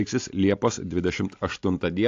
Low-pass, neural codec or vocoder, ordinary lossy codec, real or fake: 7.2 kHz; none; AAC, 48 kbps; real